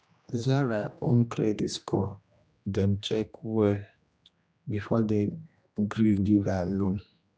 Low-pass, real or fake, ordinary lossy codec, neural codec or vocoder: none; fake; none; codec, 16 kHz, 1 kbps, X-Codec, HuBERT features, trained on general audio